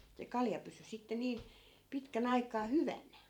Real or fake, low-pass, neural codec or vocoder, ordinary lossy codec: fake; 19.8 kHz; vocoder, 44.1 kHz, 128 mel bands every 512 samples, BigVGAN v2; none